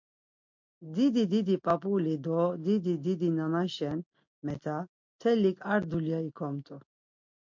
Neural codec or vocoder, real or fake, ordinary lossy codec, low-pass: none; real; MP3, 48 kbps; 7.2 kHz